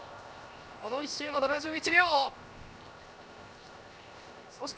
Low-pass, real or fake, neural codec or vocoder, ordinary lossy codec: none; fake; codec, 16 kHz, 0.7 kbps, FocalCodec; none